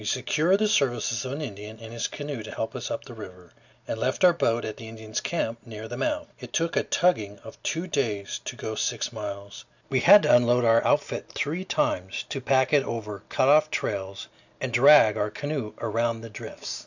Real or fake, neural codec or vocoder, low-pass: real; none; 7.2 kHz